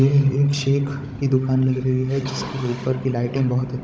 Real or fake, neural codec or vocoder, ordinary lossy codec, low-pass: fake; codec, 16 kHz, 16 kbps, FunCodec, trained on Chinese and English, 50 frames a second; none; none